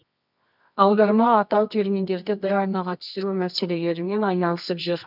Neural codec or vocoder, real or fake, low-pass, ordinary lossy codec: codec, 24 kHz, 0.9 kbps, WavTokenizer, medium music audio release; fake; 5.4 kHz; none